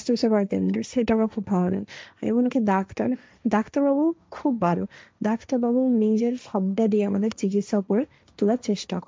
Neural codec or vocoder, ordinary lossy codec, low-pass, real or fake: codec, 16 kHz, 1.1 kbps, Voila-Tokenizer; none; none; fake